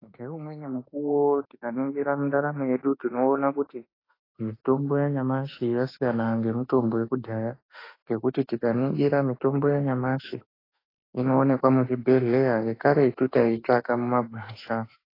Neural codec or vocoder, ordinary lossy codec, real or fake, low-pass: autoencoder, 48 kHz, 32 numbers a frame, DAC-VAE, trained on Japanese speech; AAC, 24 kbps; fake; 5.4 kHz